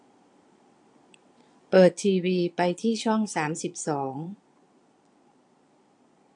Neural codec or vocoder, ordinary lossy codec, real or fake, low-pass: vocoder, 22.05 kHz, 80 mel bands, Vocos; AAC, 64 kbps; fake; 9.9 kHz